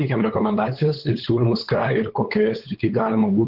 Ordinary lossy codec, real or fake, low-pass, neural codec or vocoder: Opus, 16 kbps; fake; 5.4 kHz; codec, 16 kHz, 8 kbps, FunCodec, trained on LibriTTS, 25 frames a second